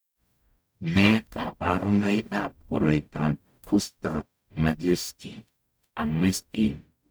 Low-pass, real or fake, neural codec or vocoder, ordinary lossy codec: none; fake; codec, 44.1 kHz, 0.9 kbps, DAC; none